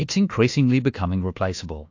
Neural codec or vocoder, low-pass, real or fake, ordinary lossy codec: codec, 24 kHz, 1.2 kbps, DualCodec; 7.2 kHz; fake; AAC, 48 kbps